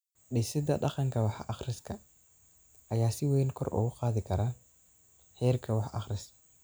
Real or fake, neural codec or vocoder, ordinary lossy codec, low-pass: real; none; none; none